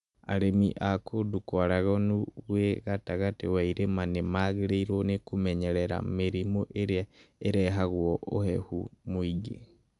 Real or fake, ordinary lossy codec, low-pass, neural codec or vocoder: real; none; 10.8 kHz; none